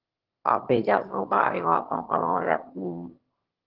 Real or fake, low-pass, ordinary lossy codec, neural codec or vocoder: fake; 5.4 kHz; Opus, 16 kbps; autoencoder, 22.05 kHz, a latent of 192 numbers a frame, VITS, trained on one speaker